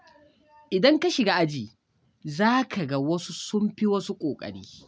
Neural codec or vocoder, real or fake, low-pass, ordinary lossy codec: none; real; none; none